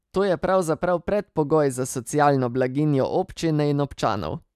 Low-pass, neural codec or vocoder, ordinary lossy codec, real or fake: 14.4 kHz; none; none; real